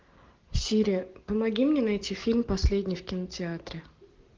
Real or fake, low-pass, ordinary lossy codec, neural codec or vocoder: real; 7.2 kHz; Opus, 16 kbps; none